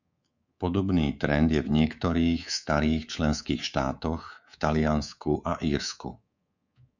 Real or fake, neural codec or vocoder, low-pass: fake; codec, 24 kHz, 3.1 kbps, DualCodec; 7.2 kHz